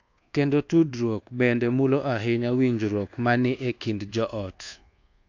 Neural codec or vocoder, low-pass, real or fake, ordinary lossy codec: codec, 24 kHz, 1.2 kbps, DualCodec; 7.2 kHz; fake; AAC, 48 kbps